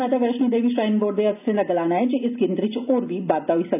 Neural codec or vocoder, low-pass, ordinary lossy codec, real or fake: none; 3.6 kHz; none; real